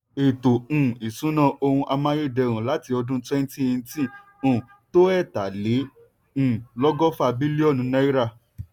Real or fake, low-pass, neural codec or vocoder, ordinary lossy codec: real; 19.8 kHz; none; none